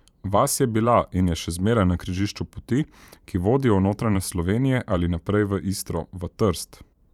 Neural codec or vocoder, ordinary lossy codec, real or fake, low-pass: vocoder, 44.1 kHz, 128 mel bands every 512 samples, BigVGAN v2; none; fake; 19.8 kHz